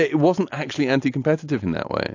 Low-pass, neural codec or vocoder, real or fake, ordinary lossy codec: 7.2 kHz; none; real; AAC, 48 kbps